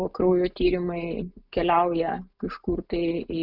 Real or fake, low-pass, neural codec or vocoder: real; 5.4 kHz; none